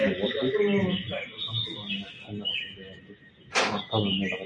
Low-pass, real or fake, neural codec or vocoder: 9.9 kHz; real; none